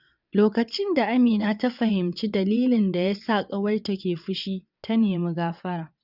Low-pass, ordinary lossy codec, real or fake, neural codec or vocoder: 5.4 kHz; none; fake; vocoder, 22.05 kHz, 80 mel bands, WaveNeXt